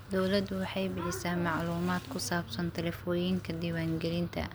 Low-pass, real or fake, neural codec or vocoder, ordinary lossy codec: none; real; none; none